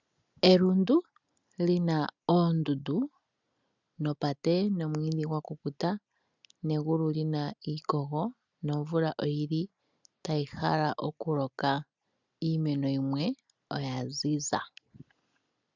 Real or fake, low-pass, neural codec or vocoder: real; 7.2 kHz; none